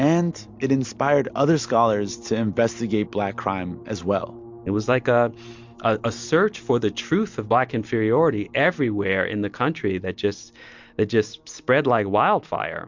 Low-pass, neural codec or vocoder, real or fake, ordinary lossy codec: 7.2 kHz; none; real; MP3, 64 kbps